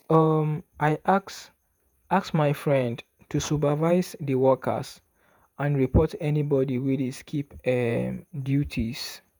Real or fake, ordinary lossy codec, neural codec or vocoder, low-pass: fake; none; vocoder, 48 kHz, 128 mel bands, Vocos; none